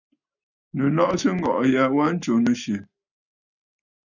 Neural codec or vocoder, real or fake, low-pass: none; real; 7.2 kHz